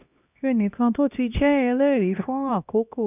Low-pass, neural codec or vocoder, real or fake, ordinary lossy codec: 3.6 kHz; codec, 16 kHz, 1 kbps, X-Codec, HuBERT features, trained on LibriSpeech; fake; none